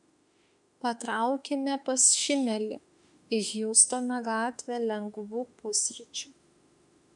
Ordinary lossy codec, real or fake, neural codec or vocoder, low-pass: MP3, 96 kbps; fake; autoencoder, 48 kHz, 32 numbers a frame, DAC-VAE, trained on Japanese speech; 10.8 kHz